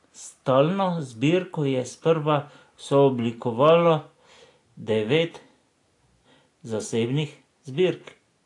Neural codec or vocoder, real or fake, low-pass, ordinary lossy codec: none; real; 10.8 kHz; AAC, 48 kbps